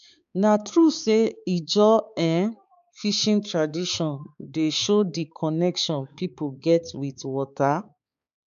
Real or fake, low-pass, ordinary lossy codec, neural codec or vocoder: fake; 7.2 kHz; none; codec, 16 kHz, 4 kbps, X-Codec, HuBERT features, trained on balanced general audio